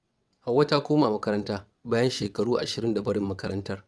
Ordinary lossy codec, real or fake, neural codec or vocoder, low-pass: none; fake; vocoder, 22.05 kHz, 80 mel bands, WaveNeXt; none